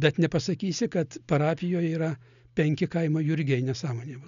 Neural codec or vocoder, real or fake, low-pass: none; real; 7.2 kHz